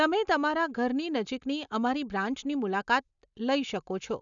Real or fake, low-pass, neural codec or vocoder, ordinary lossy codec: real; 7.2 kHz; none; none